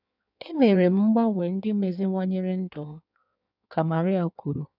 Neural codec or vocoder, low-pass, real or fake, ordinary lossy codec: codec, 16 kHz in and 24 kHz out, 1.1 kbps, FireRedTTS-2 codec; 5.4 kHz; fake; none